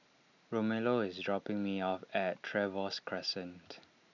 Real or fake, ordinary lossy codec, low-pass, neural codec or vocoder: real; none; 7.2 kHz; none